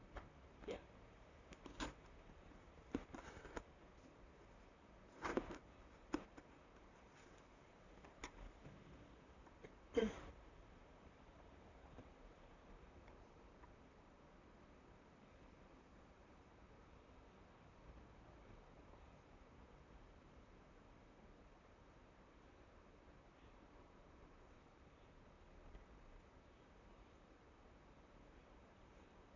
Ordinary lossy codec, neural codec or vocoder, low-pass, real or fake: none; vocoder, 44.1 kHz, 128 mel bands, Pupu-Vocoder; 7.2 kHz; fake